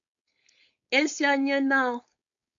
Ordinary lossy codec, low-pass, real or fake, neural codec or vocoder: AAC, 64 kbps; 7.2 kHz; fake; codec, 16 kHz, 4.8 kbps, FACodec